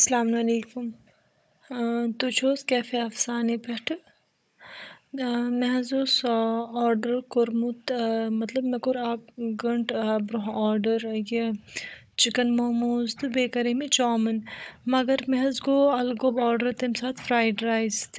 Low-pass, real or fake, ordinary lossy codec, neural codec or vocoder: none; fake; none; codec, 16 kHz, 16 kbps, FunCodec, trained on Chinese and English, 50 frames a second